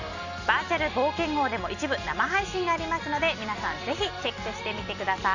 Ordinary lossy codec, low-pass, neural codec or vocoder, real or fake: none; 7.2 kHz; none; real